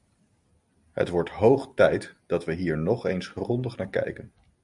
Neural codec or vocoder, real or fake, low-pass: none; real; 10.8 kHz